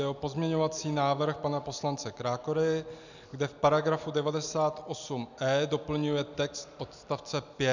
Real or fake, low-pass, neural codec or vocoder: real; 7.2 kHz; none